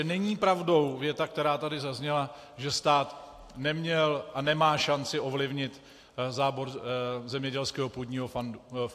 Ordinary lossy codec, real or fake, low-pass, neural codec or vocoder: AAC, 64 kbps; real; 14.4 kHz; none